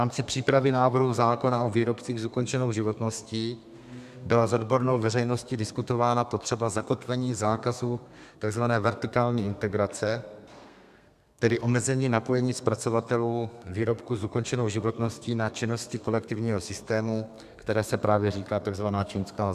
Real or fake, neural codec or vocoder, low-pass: fake; codec, 32 kHz, 1.9 kbps, SNAC; 14.4 kHz